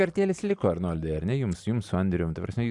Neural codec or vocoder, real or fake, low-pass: none; real; 10.8 kHz